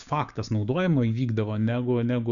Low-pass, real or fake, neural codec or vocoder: 7.2 kHz; fake; codec, 16 kHz, 6 kbps, DAC